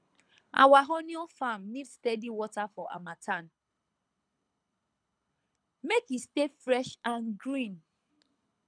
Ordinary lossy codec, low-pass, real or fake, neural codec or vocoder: none; 9.9 kHz; fake; codec, 24 kHz, 6 kbps, HILCodec